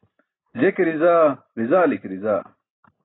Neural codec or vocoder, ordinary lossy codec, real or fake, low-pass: none; AAC, 16 kbps; real; 7.2 kHz